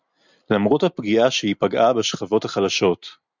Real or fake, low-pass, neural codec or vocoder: real; 7.2 kHz; none